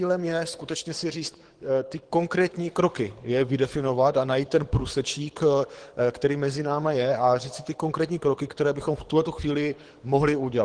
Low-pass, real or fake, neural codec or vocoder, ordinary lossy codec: 9.9 kHz; fake; codec, 24 kHz, 6 kbps, HILCodec; Opus, 16 kbps